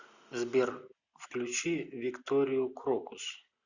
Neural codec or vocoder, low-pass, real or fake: none; 7.2 kHz; real